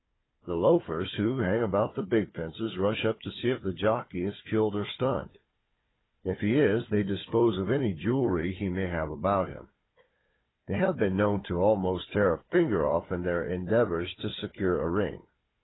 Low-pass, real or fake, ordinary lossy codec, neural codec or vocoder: 7.2 kHz; fake; AAC, 16 kbps; codec, 16 kHz, 4 kbps, FunCodec, trained on Chinese and English, 50 frames a second